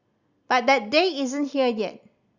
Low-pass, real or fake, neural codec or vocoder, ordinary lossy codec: 7.2 kHz; real; none; Opus, 64 kbps